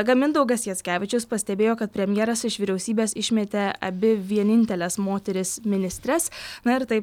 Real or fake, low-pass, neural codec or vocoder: real; 19.8 kHz; none